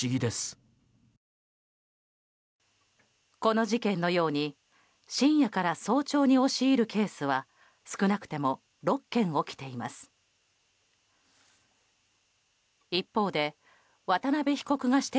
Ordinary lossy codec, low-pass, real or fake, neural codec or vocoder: none; none; real; none